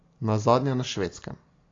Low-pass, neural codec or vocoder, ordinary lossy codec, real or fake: 7.2 kHz; none; AAC, 32 kbps; real